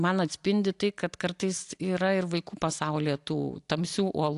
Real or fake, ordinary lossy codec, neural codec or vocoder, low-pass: real; AAC, 64 kbps; none; 10.8 kHz